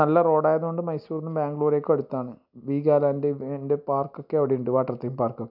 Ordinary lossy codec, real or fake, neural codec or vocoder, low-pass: none; real; none; 5.4 kHz